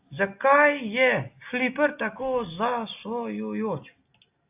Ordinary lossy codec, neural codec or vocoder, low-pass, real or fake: AAC, 32 kbps; none; 3.6 kHz; real